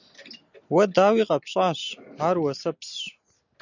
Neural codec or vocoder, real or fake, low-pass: none; real; 7.2 kHz